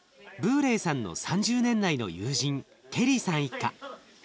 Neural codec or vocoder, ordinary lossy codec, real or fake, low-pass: none; none; real; none